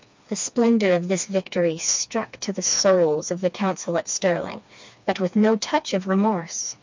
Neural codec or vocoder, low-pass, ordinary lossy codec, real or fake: codec, 16 kHz, 2 kbps, FreqCodec, smaller model; 7.2 kHz; MP3, 64 kbps; fake